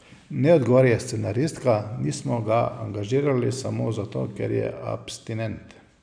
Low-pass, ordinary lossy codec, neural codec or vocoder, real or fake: 9.9 kHz; none; none; real